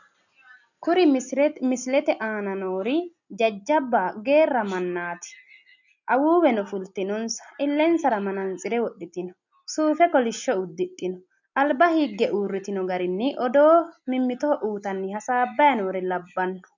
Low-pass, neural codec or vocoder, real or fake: 7.2 kHz; none; real